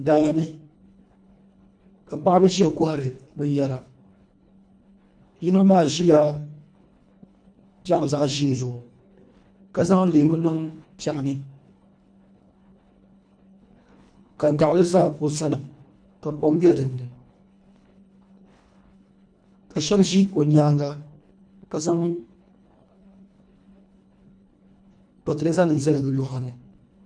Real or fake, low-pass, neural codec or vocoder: fake; 9.9 kHz; codec, 24 kHz, 1.5 kbps, HILCodec